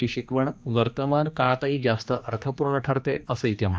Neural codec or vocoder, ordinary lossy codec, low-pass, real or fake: codec, 16 kHz, 2 kbps, X-Codec, HuBERT features, trained on general audio; none; none; fake